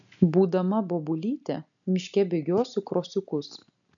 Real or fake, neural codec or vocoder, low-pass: real; none; 7.2 kHz